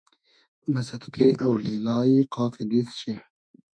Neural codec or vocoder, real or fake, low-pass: autoencoder, 48 kHz, 32 numbers a frame, DAC-VAE, trained on Japanese speech; fake; 9.9 kHz